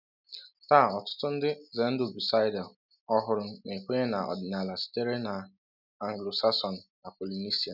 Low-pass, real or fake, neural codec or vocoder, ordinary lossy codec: 5.4 kHz; real; none; none